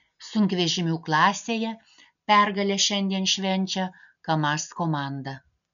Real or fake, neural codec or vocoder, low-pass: real; none; 7.2 kHz